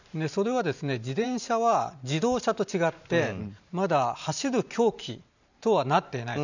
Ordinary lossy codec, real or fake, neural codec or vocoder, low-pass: none; fake; vocoder, 44.1 kHz, 128 mel bands every 512 samples, BigVGAN v2; 7.2 kHz